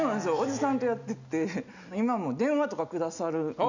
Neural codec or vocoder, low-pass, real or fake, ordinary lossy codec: none; 7.2 kHz; real; none